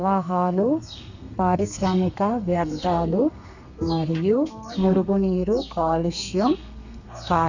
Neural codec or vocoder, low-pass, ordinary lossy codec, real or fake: codec, 32 kHz, 1.9 kbps, SNAC; 7.2 kHz; none; fake